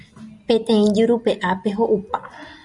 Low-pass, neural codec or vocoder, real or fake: 10.8 kHz; none; real